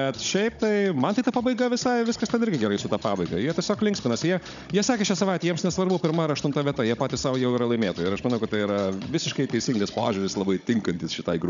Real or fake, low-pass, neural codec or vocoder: fake; 7.2 kHz; codec, 16 kHz, 16 kbps, FunCodec, trained on Chinese and English, 50 frames a second